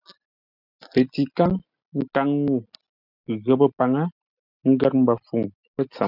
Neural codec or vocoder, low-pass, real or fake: none; 5.4 kHz; real